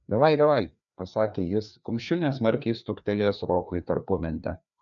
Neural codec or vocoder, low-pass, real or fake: codec, 16 kHz, 2 kbps, FreqCodec, larger model; 7.2 kHz; fake